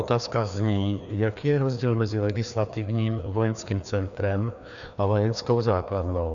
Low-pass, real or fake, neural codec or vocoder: 7.2 kHz; fake; codec, 16 kHz, 2 kbps, FreqCodec, larger model